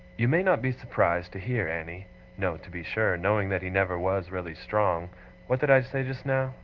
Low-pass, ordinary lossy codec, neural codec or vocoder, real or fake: 7.2 kHz; Opus, 16 kbps; none; real